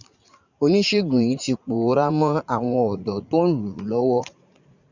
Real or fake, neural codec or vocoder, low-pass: fake; vocoder, 44.1 kHz, 80 mel bands, Vocos; 7.2 kHz